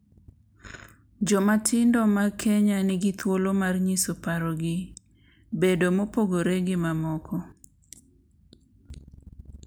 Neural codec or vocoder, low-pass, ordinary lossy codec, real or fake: none; none; none; real